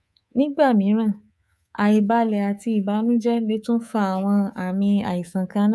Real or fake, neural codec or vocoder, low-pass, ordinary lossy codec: fake; codec, 24 kHz, 3.1 kbps, DualCodec; none; none